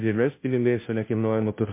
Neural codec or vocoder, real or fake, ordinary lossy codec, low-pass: codec, 16 kHz, 0.5 kbps, FunCodec, trained on Chinese and English, 25 frames a second; fake; MP3, 24 kbps; 3.6 kHz